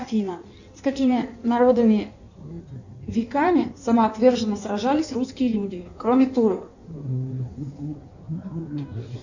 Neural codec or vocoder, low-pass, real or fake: codec, 16 kHz in and 24 kHz out, 1.1 kbps, FireRedTTS-2 codec; 7.2 kHz; fake